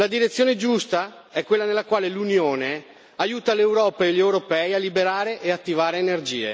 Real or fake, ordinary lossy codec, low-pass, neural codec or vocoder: real; none; none; none